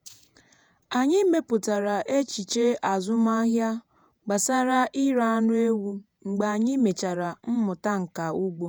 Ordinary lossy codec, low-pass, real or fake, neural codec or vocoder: none; none; fake; vocoder, 48 kHz, 128 mel bands, Vocos